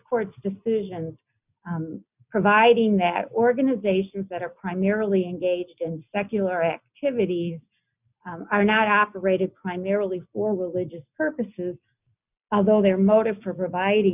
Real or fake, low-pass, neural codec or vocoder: real; 3.6 kHz; none